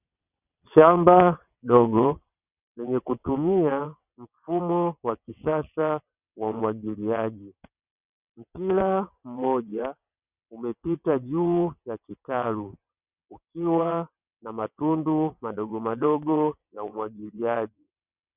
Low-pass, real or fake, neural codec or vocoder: 3.6 kHz; fake; vocoder, 22.05 kHz, 80 mel bands, WaveNeXt